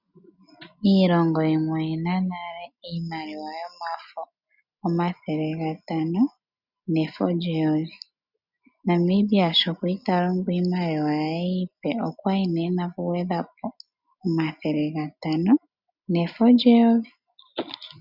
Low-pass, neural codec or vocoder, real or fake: 5.4 kHz; none; real